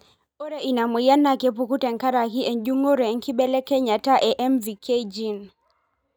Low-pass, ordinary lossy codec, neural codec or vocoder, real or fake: none; none; none; real